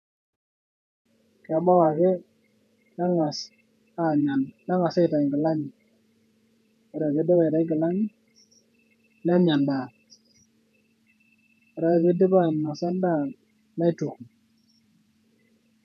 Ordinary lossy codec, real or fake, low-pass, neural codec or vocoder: none; fake; 14.4 kHz; vocoder, 48 kHz, 128 mel bands, Vocos